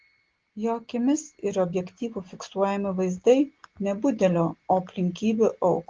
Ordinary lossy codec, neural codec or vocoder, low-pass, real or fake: Opus, 16 kbps; none; 7.2 kHz; real